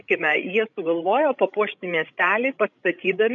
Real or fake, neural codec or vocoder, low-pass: fake; codec, 16 kHz, 16 kbps, FreqCodec, larger model; 7.2 kHz